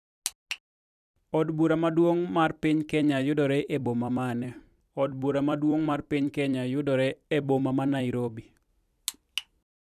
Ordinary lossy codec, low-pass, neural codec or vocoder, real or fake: none; 14.4 kHz; vocoder, 44.1 kHz, 128 mel bands every 256 samples, BigVGAN v2; fake